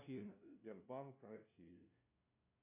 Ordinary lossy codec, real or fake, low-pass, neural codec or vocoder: MP3, 24 kbps; fake; 3.6 kHz; codec, 16 kHz, 0.5 kbps, FunCodec, trained on LibriTTS, 25 frames a second